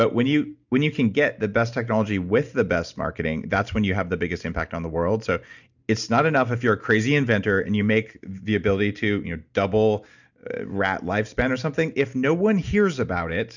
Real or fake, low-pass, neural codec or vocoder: real; 7.2 kHz; none